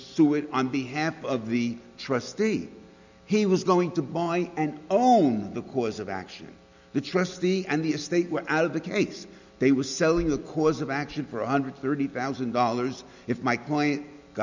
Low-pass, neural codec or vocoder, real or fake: 7.2 kHz; none; real